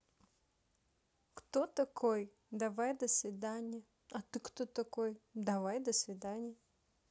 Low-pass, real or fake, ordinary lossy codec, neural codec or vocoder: none; real; none; none